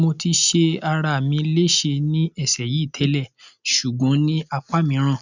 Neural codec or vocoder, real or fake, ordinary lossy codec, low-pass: none; real; none; 7.2 kHz